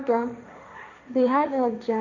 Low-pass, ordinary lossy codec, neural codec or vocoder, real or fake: 7.2 kHz; none; codec, 16 kHz, 1.1 kbps, Voila-Tokenizer; fake